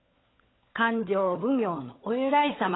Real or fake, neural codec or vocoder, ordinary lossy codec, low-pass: fake; codec, 16 kHz, 16 kbps, FunCodec, trained on LibriTTS, 50 frames a second; AAC, 16 kbps; 7.2 kHz